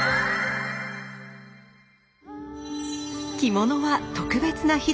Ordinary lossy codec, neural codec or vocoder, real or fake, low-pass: none; none; real; none